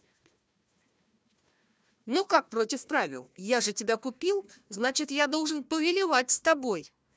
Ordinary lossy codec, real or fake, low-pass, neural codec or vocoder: none; fake; none; codec, 16 kHz, 1 kbps, FunCodec, trained on Chinese and English, 50 frames a second